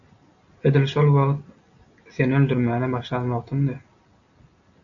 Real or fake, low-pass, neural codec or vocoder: real; 7.2 kHz; none